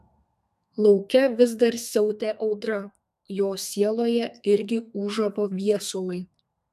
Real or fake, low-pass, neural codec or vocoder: fake; 14.4 kHz; codec, 32 kHz, 1.9 kbps, SNAC